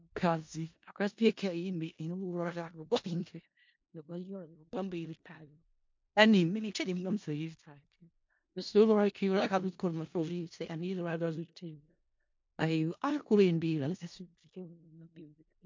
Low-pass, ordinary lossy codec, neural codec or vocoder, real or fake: 7.2 kHz; MP3, 48 kbps; codec, 16 kHz in and 24 kHz out, 0.4 kbps, LongCat-Audio-Codec, four codebook decoder; fake